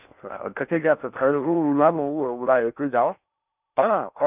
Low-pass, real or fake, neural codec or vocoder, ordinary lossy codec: 3.6 kHz; fake; codec, 16 kHz in and 24 kHz out, 0.6 kbps, FocalCodec, streaming, 4096 codes; none